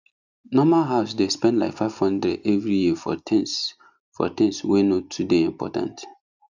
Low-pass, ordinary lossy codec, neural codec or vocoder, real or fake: 7.2 kHz; none; none; real